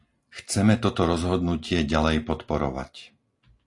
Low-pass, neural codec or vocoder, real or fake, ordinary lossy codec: 10.8 kHz; none; real; AAC, 48 kbps